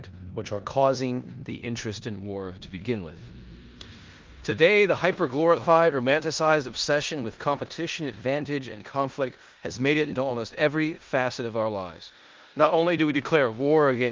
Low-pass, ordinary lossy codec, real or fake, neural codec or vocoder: 7.2 kHz; Opus, 24 kbps; fake; codec, 16 kHz in and 24 kHz out, 0.9 kbps, LongCat-Audio-Codec, four codebook decoder